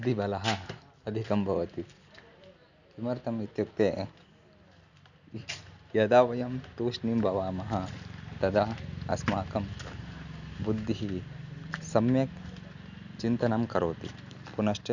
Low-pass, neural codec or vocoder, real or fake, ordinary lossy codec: 7.2 kHz; none; real; none